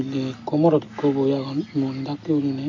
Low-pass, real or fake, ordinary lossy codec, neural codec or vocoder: 7.2 kHz; real; MP3, 48 kbps; none